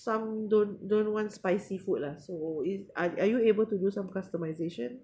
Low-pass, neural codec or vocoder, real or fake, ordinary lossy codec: none; none; real; none